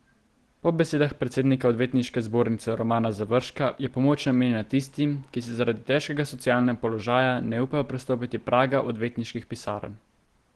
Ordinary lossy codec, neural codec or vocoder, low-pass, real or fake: Opus, 16 kbps; none; 10.8 kHz; real